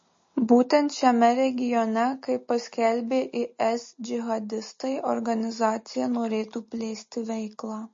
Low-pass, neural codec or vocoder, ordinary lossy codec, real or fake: 7.2 kHz; none; MP3, 32 kbps; real